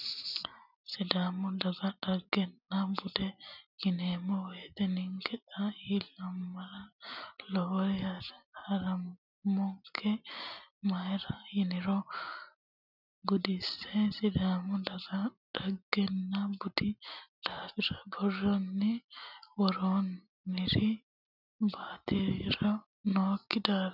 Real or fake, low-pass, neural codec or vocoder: real; 5.4 kHz; none